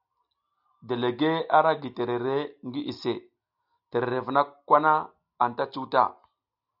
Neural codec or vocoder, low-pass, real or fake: none; 5.4 kHz; real